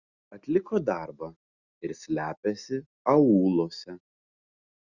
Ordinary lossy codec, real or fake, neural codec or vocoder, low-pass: Opus, 64 kbps; real; none; 7.2 kHz